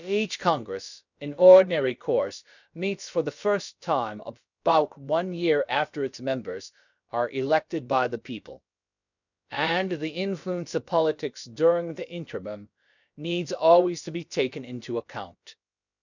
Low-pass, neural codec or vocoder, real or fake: 7.2 kHz; codec, 16 kHz, about 1 kbps, DyCAST, with the encoder's durations; fake